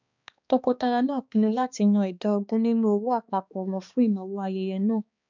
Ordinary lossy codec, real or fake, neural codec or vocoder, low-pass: none; fake; codec, 16 kHz, 2 kbps, X-Codec, HuBERT features, trained on balanced general audio; 7.2 kHz